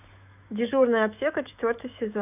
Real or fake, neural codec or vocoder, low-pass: fake; autoencoder, 48 kHz, 128 numbers a frame, DAC-VAE, trained on Japanese speech; 3.6 kHz